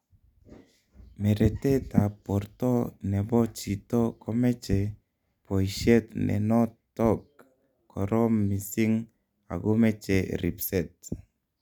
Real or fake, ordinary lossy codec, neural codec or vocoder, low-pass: real; none; none; 19.8 kHz